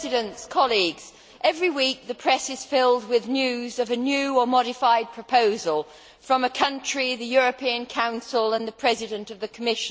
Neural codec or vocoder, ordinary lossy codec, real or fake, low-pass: none; none; real; none